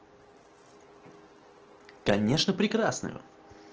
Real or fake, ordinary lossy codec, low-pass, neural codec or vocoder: real; Opus, 16 kbps; 7.2 kHz; none